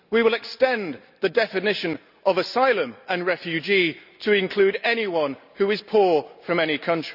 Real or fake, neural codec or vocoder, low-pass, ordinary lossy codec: real; none; 5.4 kHz; none